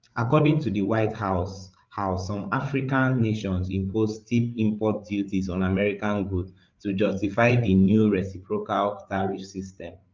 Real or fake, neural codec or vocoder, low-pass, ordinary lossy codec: fake; codec, 16 kHz, 8 kbps, FreqCodec, larger model; 7.2 kHz; Opus, 24 kbps